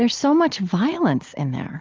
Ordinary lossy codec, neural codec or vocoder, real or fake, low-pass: Opus, 24 kbps; none; real; 7.2 kHz